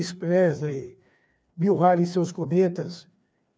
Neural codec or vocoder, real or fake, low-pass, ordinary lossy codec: codec, 16 kHz, 2 kbps, FreqCodec, larger model; fake; none; none